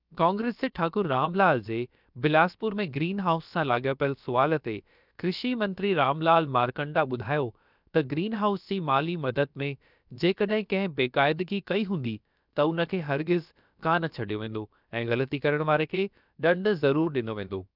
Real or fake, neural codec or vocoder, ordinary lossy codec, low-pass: fake; codec, 16 kHz, about 1 kbps, DyCAST, with the encoder's durations; none; 5.4 kHz